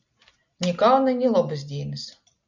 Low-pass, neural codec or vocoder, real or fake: 7.2 kHz; none; real